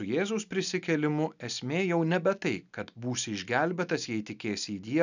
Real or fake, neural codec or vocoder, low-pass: real; none; 7.2 kHz